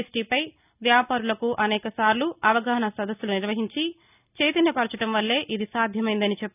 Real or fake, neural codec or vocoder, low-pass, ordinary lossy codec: real; none; 3.6 kHz; none